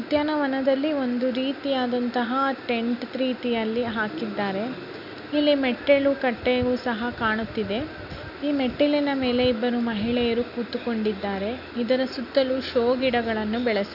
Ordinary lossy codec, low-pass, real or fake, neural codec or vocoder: none; 5.4 kHz; real; none